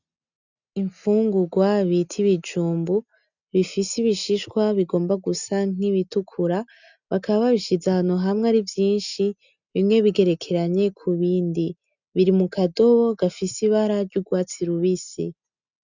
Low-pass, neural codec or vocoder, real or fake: 7.2 kHz; none; real